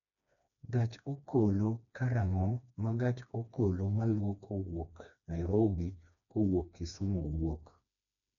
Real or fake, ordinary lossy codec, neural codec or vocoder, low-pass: fake; none; codec, 16 kHz, 2 kbps, FreqCodec, smaller model; 7.2 kHz